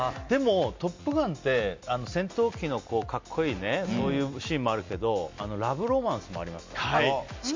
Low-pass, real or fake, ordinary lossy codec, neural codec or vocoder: 7.2 kHz; real; MP3, 48 kbps; none